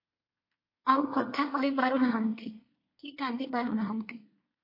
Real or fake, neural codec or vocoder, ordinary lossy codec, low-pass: fake; codec, 24 kHz, 1 kbps, SNAC; MP3, 32 kbps; 5.4 kHz